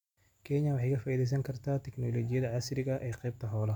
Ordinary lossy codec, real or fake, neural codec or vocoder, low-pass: none; real; none; 19.8 kHz